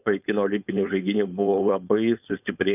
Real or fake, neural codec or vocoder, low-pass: fake; codec, 16 kHz, 4.8 kbps, FACodec; 3.6 kHz